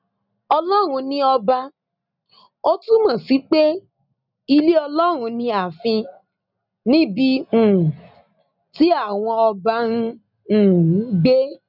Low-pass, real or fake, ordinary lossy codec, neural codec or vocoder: 5.4 kHz; real; none; none